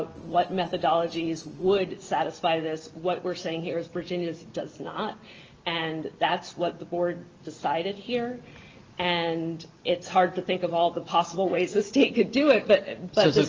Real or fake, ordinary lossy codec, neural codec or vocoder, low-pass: real; Opus, 24 kbps; none; 7.2 kHz